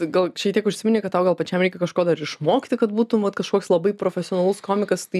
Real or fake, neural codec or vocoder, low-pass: real; none; 14.4 kHz